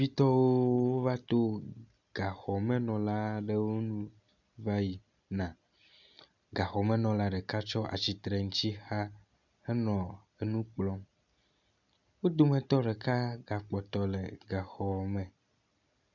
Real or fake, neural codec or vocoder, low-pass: real; none; 7.2 kHz